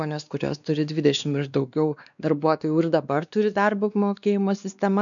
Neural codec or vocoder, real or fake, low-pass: codec, 16 kHz, 2 kbps, X-Codec, WavLM features, trained on Multilingual LibriSpeech; fake; 7.2 kHz